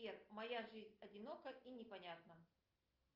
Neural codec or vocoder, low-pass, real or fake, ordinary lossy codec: none; 5.4 kHz; real; Opus, 24 kbps